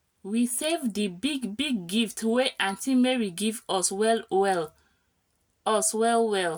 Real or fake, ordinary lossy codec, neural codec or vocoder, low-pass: real; none; none; none